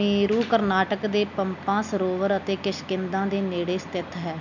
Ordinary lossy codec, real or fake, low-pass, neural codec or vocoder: none; real; 7.2 kHz; none